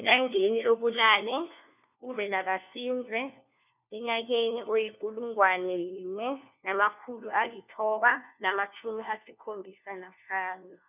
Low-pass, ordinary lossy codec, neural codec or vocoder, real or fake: 3.6 kHz; none; codec, 16 kHz, 1 kbps, FunCodec, trained on LibriTTS, 50 frames a second; fake